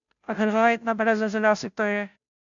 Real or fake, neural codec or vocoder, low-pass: fake; codec, 16 kHz, 0.5 kbps, FunCodec, trained on Chinese and English, 25 frames a second; 7.2 kHz